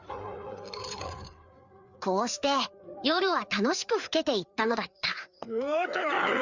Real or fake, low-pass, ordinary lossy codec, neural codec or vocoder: fake; 7.2 kHz; Opus, 64 kbps; codec, 16 kHz, 4 kbps, FreqCodec, larger model